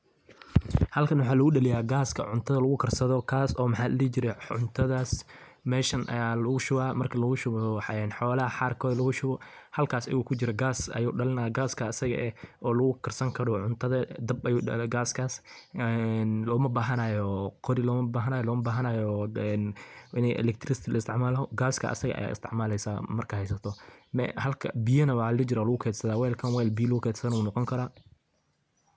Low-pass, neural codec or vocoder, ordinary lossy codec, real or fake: none; none; none; real